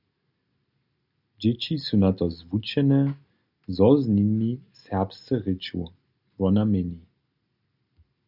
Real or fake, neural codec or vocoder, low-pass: real; none; 5.4 kHz